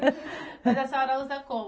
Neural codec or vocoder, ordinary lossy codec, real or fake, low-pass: none; none; real; none